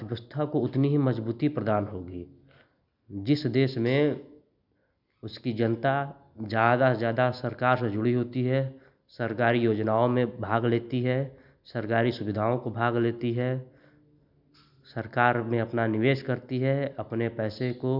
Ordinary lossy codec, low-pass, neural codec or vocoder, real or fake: none; 5.4 kHz; none; real